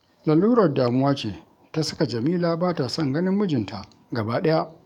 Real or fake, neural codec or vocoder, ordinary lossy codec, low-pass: fake; codec, 44.1 kHz, 7.8 kbps, DAC; none; 19.8 kHz